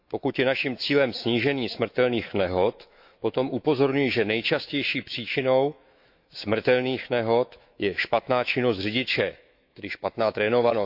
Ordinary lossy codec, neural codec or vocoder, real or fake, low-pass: AAC, 48 kbps; autoencoder, 48 kHz, 128 numbers a frame, DAC-VAE, trained on Japanese speech; fake; 5.4 kHz